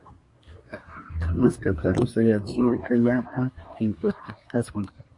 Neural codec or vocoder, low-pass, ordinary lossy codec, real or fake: codec, 24 kHz, 1 kbps, SNAC; 10.8 kHz; MP3, 48 kbps; fake